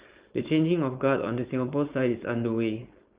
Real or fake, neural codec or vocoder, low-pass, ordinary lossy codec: fake; codec, 16 kHz, 4.8 kbps, FACodec; 3.6 kHz; Opus, 32 kbps